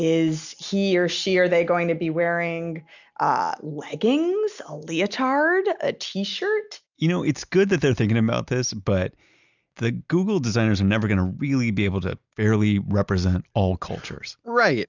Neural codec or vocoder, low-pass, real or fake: none; 7.2 kHz; real